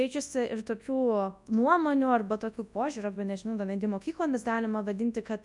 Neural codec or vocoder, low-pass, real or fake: codec, 24 kHz, 0.9 kbps, WavTokenizer, large speech release; 10.8 kHz; fake